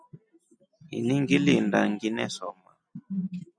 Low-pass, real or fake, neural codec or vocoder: 9.9 kHz; real; none